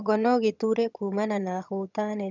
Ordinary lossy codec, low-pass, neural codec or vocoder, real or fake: none; 7.2 kHz; vocoder, 22.05 kHz, 80 mel bands, HiFi-GAN; fake